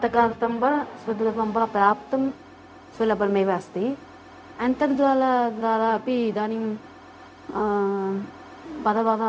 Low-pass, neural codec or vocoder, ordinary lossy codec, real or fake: none; codec, 16 kHz, 0.4 kbps, LongCat-Audio-Codec; none; fake